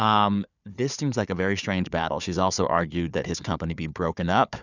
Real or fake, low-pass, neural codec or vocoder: fake; 7.2 kHz; codec, 16 kHz, 4 kbps, FunCodec, trained on Chinese and English, 50 frames a second